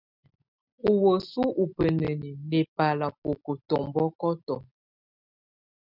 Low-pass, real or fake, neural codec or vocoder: 5.4 kHz; real; none